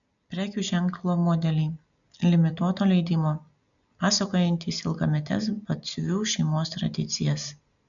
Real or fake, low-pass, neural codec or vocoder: real; 7.2 kHz; none